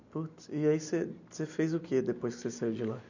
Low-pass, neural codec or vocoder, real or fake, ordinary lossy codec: 7.2 kHz; vocoder, 44.1 kHz, 128 mel bands every 256 samples, BigVGAN v2; fake; none